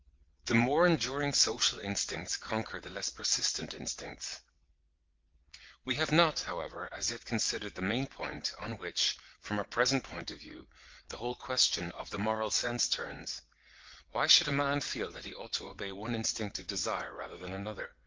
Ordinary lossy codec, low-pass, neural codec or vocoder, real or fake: Opus, 24 kbps; 7.2 kHz; vocoder, 22.05 kHz, 80 mel bands, WaveNeXt; fake